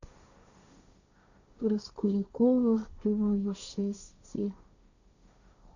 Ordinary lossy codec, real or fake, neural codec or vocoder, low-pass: none; fake; codec, 16 kHz, 1.1 kbps, Voila-Tokenizer; 7.2 kHz